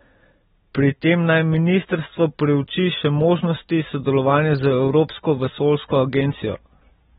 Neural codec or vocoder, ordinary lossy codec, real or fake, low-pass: none; AAC, 16 kbps; real; 19.8 kHz